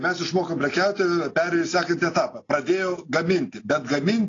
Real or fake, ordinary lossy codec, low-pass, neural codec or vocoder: real; AAC, 32 kbps; 7.2 kHz; none